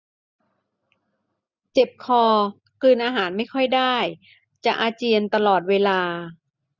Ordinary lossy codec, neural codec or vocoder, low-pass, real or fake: none; none; 7.2 kHz; real